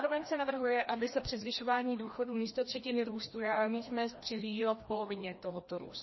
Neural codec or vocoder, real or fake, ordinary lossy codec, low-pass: codec, 16 kHz, 1 kbps, FreqCodec, larger model; fake; MP3, 24 kbps; 7.2 kHz